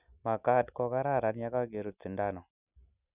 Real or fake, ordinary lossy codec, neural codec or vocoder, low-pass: real; none; none; 3.6 kHz